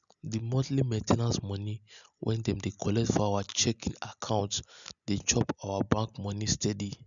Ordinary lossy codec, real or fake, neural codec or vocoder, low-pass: none; real; none; 7.2 kHz